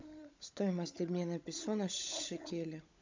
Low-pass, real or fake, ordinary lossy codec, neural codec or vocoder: 7.2 kHz; fake; MP3, 64 kbps; codec, 16 kHz, 16 kbps, FunCodec, trained on LibriTTS, 50 frames a second